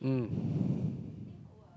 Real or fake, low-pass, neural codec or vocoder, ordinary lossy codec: real; none; none; none